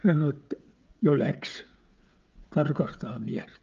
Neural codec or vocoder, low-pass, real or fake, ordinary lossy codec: codec, 16 kHz, 16 kbps, FreqCodec, smaller model; 7.2 kHz; fake; Opus, 24 kbps